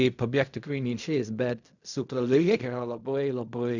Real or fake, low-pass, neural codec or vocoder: fake; 7.2 kHz; codec, 16 kHz in and 24 kHz out, 0.4 kbps, LongCat-Audio-Codec, fine tuned four codebook decoder